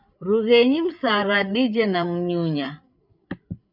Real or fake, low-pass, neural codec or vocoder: fake; 5.4 kHz; codec, 16 kHz, 8 kbps, FreqCodec, larger model